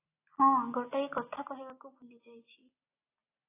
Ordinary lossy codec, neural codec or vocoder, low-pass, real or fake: AAC, 16 kbps; none; 3.6 kHz; real